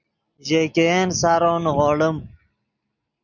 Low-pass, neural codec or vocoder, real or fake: 7.2 kHz; none; real